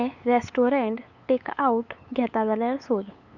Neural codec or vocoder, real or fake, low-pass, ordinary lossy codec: codec, 16 kHz, 8 kbps, FunCodec, trained on LibriTTS, 25 frames a second; fake; 7.2 kHz; none